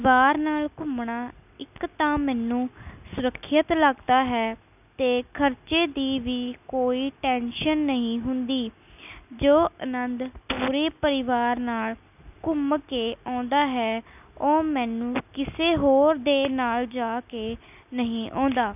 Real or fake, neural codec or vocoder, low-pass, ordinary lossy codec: real; none; 3.6 kHz; none